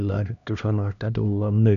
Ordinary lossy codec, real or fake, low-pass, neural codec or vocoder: none; fake; 7.2 kHz; codec, 16 kHz, 1 kbps, X-Codec, HuBERT features, trained on LibriSpeech